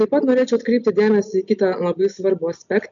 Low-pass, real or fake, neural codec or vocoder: 7.2 kHz; real; none